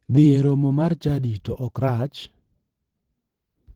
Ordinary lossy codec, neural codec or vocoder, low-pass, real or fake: Opus, 16 kbps; vocoder, 44.1 kHz, 128 mel bands every 512 samples, BigVGAN v2; 19.8 kHz; fake